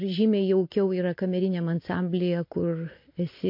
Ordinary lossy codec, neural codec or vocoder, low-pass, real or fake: MP3, 32 kbps; none; 5.4 kHz; real